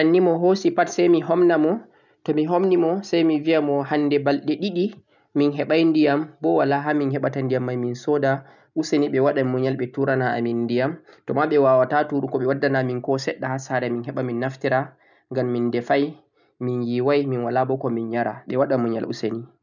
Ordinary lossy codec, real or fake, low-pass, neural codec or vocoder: none; real; 7.2 kHz; none